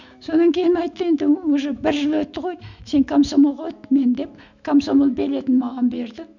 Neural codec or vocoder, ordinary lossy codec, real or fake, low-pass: autoencoder, 48 kHz, 128 numbers a frame, DAC-VAE, trained on Japanese speech; none; fake; 7.2 kHz